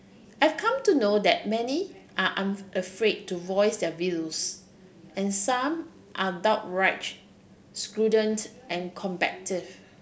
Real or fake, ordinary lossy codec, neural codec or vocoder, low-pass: real; none; none; none